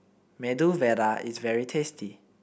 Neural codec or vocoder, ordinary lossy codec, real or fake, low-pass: none; none; real; none